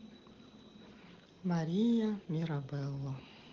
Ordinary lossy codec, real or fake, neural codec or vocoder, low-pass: Opus, 16 kbps; real; none; 7.2 kHz